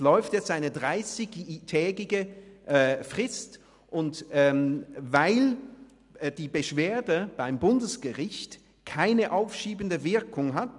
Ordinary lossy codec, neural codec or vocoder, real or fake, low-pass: none; none; real; 10.8 kHz